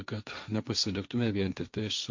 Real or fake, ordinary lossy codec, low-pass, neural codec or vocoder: fake; MP3, 64 kbps; 7.2 kHz; codec, 16 kHz, 1.1 kbps, Voila-Tokenizer